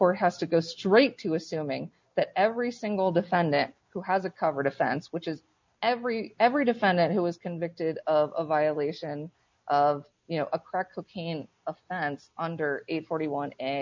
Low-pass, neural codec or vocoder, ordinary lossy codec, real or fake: 7.2 kHz; none; MP3, 64 kbps; real